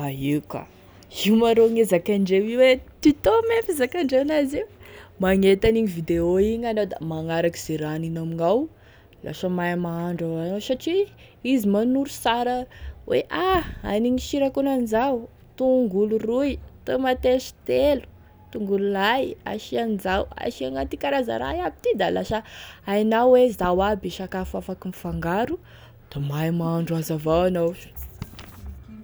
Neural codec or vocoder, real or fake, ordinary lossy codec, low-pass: none; real; none; none